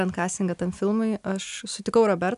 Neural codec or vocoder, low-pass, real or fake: none; 10.8 kHz; real